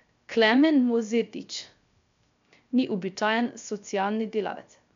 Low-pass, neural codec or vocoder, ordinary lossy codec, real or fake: 7.2 kHz; codec, 16 kHz, 0.3 kbps, FocalCodec; MP3, 64 kbps; fake